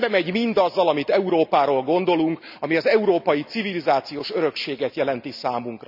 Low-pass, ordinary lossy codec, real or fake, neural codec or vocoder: 5.4 kHz; none; real; none